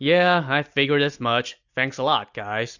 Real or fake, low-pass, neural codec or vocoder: real; 7.2 kHz; none